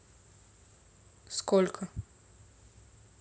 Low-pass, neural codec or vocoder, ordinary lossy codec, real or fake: none; none; none; real